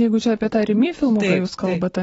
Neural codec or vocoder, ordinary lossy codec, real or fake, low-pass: none; AAC, 24 kbps; real; 19.8 kHz